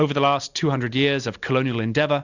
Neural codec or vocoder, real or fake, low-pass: none; real; 7.2 kHz